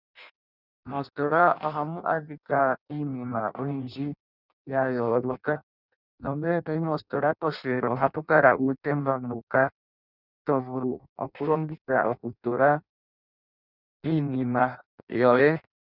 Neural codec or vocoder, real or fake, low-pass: codec, 16 kHz in and 24 kHz out, 0.6 kbps, FireRedTTS-2 codec; fake; 5.4 kHz